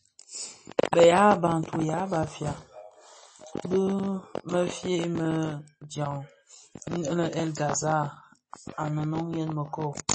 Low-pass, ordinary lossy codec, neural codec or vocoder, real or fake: 9.9 kHz; MP3, 32 kbps; none; real